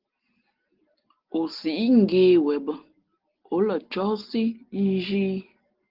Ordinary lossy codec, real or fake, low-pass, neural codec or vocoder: Opus, 16 kbps; real; 5.4 kHz; none